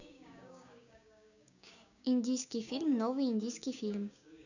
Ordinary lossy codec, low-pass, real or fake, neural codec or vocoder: none; 7.2 kHz; fake; codec, 16 kHz, 6 kbps, DAC